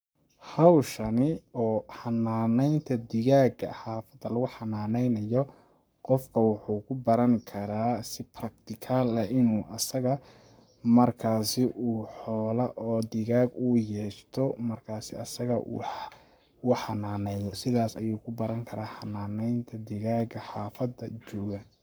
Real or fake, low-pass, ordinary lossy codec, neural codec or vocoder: fake; none; none; codec, 44.1 kHz, 7.8 kbps, Pupu-Codec